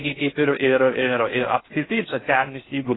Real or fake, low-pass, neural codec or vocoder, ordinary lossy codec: fake; 7.2 kHz; codec, 16 kHz in and 24 kHz out, 0.6 kbps, FocalCodec, streaming, 4096 codes; AAC, 16 kbps